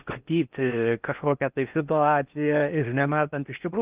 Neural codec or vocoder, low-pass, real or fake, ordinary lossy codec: codec, 16 kHz in and 24 kHz out, 0.6 kbps, FocalCodec, streaming, 4096 codes; 3.6 kHz; fake; Opus, 24 kbps